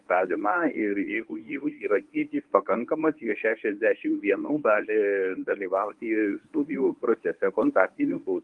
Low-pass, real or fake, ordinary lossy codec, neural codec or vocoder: 10.8 kHz; fake; Opus, 24 kbps; codec, 24 kHz, 0.9 kbps, WavTokenizer, medium speech release version 1